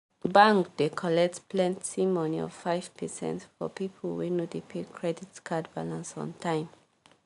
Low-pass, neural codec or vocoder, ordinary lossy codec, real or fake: 10.8 kHz; none; none; real